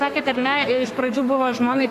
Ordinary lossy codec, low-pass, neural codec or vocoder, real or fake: AAC, 64 kbps; 14.4 kHz; codec, 32 kHz, 1.9 kbps, SNAC; fake